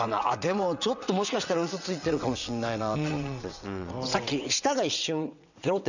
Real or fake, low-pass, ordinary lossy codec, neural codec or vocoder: fake; 7.2 kHz; none; vocoder, 22.05 kHz, 80 mel bands, WaveNeXt